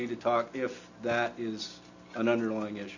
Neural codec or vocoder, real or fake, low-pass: none; real; 7.2 kHz